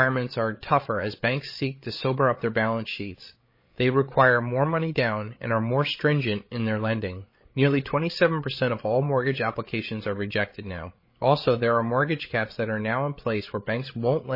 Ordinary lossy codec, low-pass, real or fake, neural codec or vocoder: MP3, 24 kbps; 5.4 kHz; fake; codec, 16 kHz, 8 kbps, FreqCodec, larger model